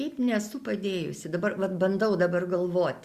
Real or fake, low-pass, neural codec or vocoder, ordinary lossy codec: real; 14.4 kHz; none; Opus, 64 kbps